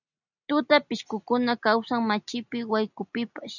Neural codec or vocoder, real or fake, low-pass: none; real; 7.2 kHz